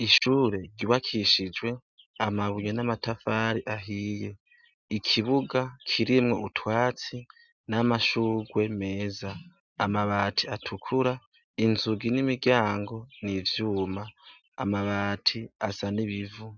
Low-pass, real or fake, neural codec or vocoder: 7.2 kHz; real; none